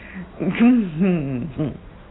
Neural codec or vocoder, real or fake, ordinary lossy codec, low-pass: none; real; AAC, 16 kbps; 7.2 kHz